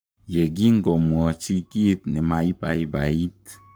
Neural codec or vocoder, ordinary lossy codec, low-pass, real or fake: codec, 44.1 kHz, 7.8 kbps, Pupu-Codec; none; none; fake